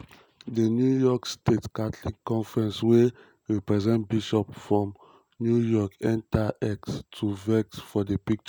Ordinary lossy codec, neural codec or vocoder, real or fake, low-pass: none; none; real; none